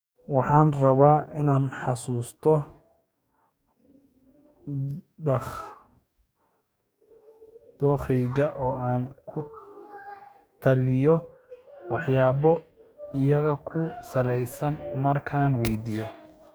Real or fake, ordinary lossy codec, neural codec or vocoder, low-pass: fake; none; codec, 44.1 kHz, 2.6 kbps, DAC; none